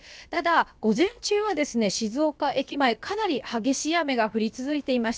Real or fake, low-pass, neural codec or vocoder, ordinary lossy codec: fake; none; codec, 16 kHz, about 1 kbps, DyCAST, with the encoder's durations; none